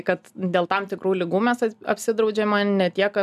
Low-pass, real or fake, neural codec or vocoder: 14.4 kHz; real; none